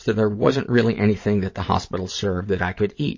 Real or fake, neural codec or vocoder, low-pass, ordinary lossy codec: fake; vocoder, 22.05 kHz, 80 mel bands, WaveNeXt; 7.2 kHz; MP3, 32 kbps